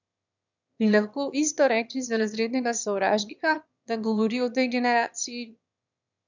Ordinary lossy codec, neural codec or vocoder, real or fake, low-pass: none; autoencoder, 22.05 kHz, a latent of 192 numbers a frame, VITS, trained on one speaker; fake; 7.2 kHz